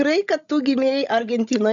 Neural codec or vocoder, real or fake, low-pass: codec, 16 kHz, 16 kbps, FreqCodec, larger model; fake; 7.2 kHz